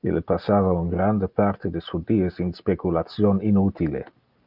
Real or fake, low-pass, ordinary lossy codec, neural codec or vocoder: real; 5.4 kHz; Opus, 24 kbps; none